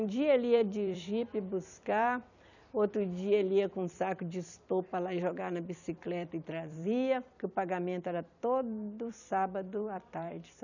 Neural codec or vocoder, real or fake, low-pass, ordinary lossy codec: vocoder, 44.1 kHz, 128 mel bands every 256 samples, BigVGAN v2; fake; 7.2 kHz; none